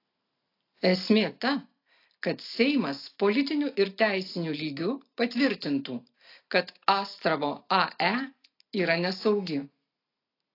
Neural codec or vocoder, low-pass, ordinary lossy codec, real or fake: none; 5.4 kHz; AAC, 32 kbps; real